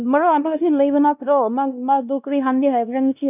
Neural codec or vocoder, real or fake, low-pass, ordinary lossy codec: codec, 16 kHz, 1 kbps, X-Codec, WavLM features, trained on Multilingual LibriSpeech; fake; 3.6 kHz; none